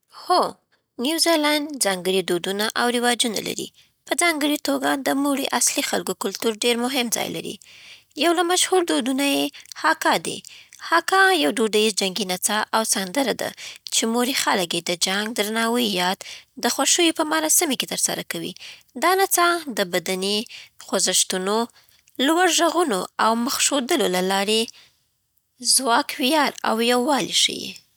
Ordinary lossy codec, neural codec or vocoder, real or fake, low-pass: none; none; real; none